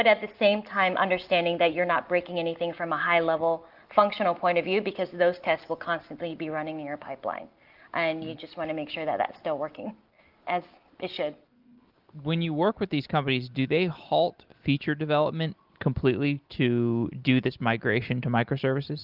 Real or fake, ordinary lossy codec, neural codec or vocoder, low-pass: real; Opus, 32 kbps; none; 5.4 kHz